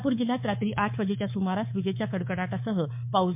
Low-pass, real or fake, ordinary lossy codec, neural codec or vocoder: 3.6 kHz; fake; MP3, 32 kbps; codec, 24 kHz, 3.1 kbps, DualCodec